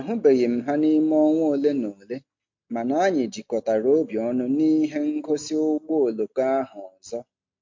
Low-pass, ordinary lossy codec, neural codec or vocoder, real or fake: 7.2 kHz; MP3, 48 kbps; none; real